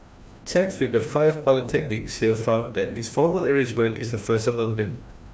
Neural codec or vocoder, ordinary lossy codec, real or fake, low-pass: codec, 16 kHz, 1 kbps, FreqCodec, larger model; none; fake; none